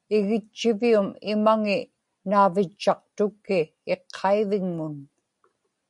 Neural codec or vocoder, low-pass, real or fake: none; 10.8 kHz; real